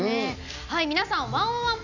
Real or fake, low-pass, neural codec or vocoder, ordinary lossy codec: real; 7.2 kHz; none; none